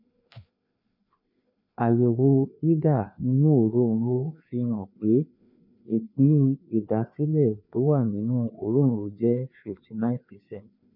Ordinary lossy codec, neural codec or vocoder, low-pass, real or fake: none; codec, 16 kHz, 2 kbps, FreqCodec, larger model; 5.4 kHz; fake